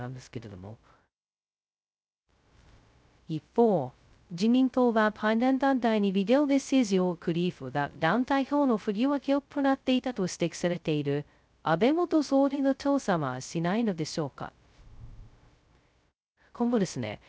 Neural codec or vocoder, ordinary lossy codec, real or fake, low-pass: codec, 16 kHz, 0.2 kbps, FocalCodec; none; fake; none